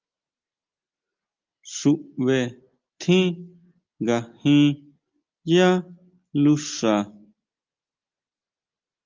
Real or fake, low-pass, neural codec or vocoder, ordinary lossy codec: real; 7.2 kHz; none; Opus, 24 kbps